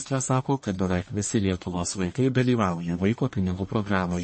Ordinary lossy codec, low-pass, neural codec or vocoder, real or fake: MP3, 32 kbps; 9.9 kHz; codec, 44.1 kHz, 1.7 kbps, Pupu-Codec; fake